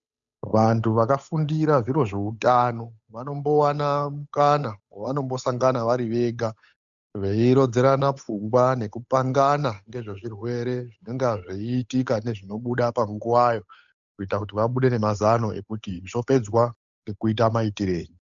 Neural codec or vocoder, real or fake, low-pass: codec, 16 kHz, 8 kbps, FunCodec, trained on Chinese and English, 25 frames a second; fake; 7.2 kHz